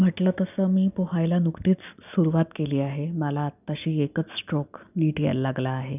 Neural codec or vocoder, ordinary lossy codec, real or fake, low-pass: none; none; real; 3.6 kHz